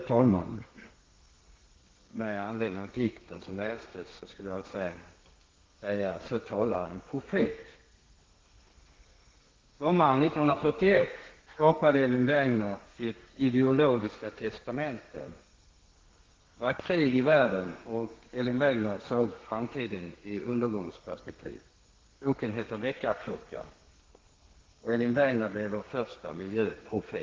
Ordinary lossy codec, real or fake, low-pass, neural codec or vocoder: Opus, 16 kbps; fake; 7.2 kHz; codec, 32 kHz, 1.9 kbps, SNAC